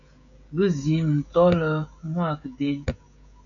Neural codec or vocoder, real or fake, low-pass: codec, 16 kHz, 16 kbps, FreqCodec, smaller model; fake; 7.2 kHz